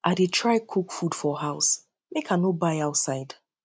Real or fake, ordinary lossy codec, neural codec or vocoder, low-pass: real; none; none; none